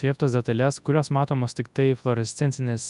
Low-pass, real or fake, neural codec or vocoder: 10.8 kHz; fake; codec, 24 kHz, 0.9 kbps, WavTokenizer, large speech release